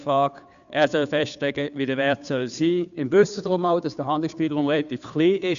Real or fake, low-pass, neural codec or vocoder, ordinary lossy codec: fake; 7.2 kHz; codec, 16 kHz, 2 kbps, FunCodec, trained on Chinese and English, 25 frames a second; none